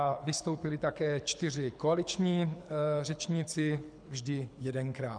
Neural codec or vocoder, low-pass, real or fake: codec, 24 kHz, 6 kbps, HILCodec; 9.9 kHz; fake